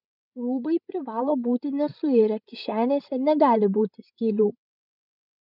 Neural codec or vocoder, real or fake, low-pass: codec, 16 kHz, 16 kbps, FreqCodec, larger model; fake; 5.4 kHz